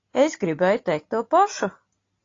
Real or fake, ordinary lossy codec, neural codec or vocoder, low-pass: real; AAC, 32 kbps; none; 7.2 kHz